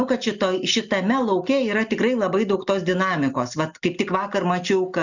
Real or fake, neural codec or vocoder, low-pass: real; none; 7.2 kHz